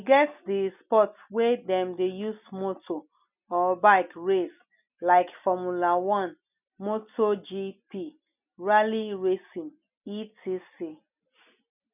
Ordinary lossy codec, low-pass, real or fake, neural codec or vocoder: none; 3.6 kHz; real; none